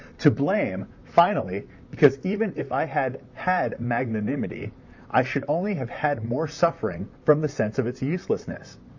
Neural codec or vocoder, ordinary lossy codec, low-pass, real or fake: vocoder, 44.1 kHz, 128 mel bands, Pupu-Vocoder; AAC, 48 kbps; 7.2 kHz; fake